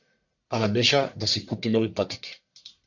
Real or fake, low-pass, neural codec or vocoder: fake; 7.2 kHz; codec, 44.1 kHz, 1.7 kbps, Pupu-Codec